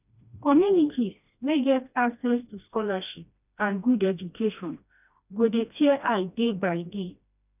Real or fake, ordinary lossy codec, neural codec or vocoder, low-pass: fake; none; codec, 16 kHz, 1 kbps, FreqCodec, smaller model; 3.6 kHz